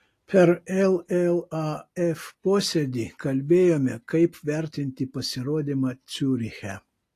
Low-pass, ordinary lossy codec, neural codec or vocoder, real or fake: 14.4 kHz; AAC, 48 kbps; none; real